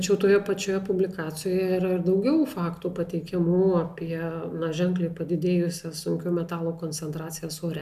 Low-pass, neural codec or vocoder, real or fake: 14.4 kHz; none; real